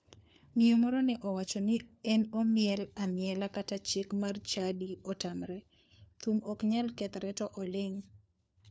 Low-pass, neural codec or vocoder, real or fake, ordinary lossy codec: none; codec, 16 kHz, 4 kbps, FunCodec, trained on LibriTTS, 50 frames a second; fake; none